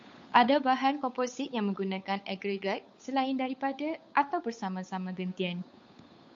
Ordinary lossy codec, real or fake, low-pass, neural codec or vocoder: MP3, 48 kbps; fake; 7.2 kHz; codec, 16 kHz, 8 kbps, FunCodec, trained on Chinese and English, 25 frames a second